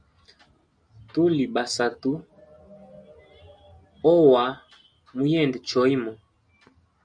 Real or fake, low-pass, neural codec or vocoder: real; 9.9 kHz; none